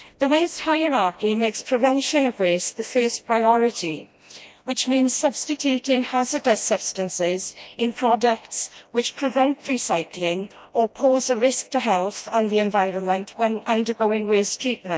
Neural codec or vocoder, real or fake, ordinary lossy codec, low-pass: codec, 16 kHz, 1 kbps, FreqCodec, smaller model; fake; none; none